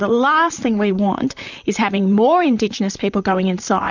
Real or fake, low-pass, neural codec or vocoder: fake; 7.2 kHz; vocoder, 44.1 kHz, 128 mel bands, Pupu-Vocoder